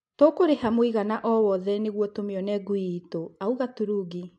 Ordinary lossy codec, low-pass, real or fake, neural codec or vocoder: AAC, 64 kbps; 10.8 kHz; real; none